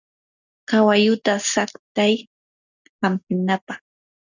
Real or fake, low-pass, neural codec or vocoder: real; 7.2 kHz; none